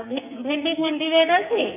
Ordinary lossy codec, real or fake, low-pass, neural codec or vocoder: none; fake; 3.6 kHz; codec, 44.1 kHz, 2.6 kbps, SNAC